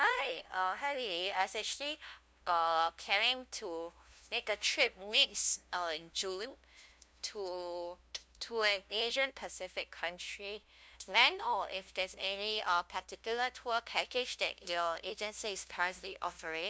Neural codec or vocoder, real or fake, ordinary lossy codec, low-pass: codec, 16 kHz, 0.5 kbps, FunCodec, trained on LibriTTS, 25 frames a second; fake; none; none